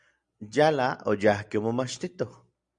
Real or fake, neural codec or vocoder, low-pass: real; none; 9.9 kHz